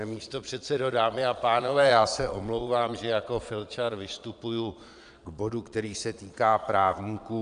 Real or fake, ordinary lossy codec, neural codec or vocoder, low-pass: fake; AAC, 96 kbps; vocoder, 22.05 kHz, 80 mel bands, Vocos; 9.9 kHz